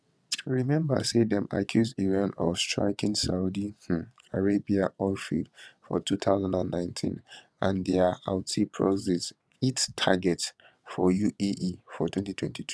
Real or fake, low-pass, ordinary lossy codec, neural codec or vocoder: fake; none; none; vocoder, 22.05 kHz, 80 mel bands, WaveNeXt